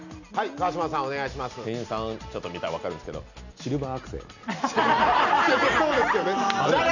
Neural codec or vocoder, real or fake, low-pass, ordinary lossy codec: none; real; 7.2 kHz; none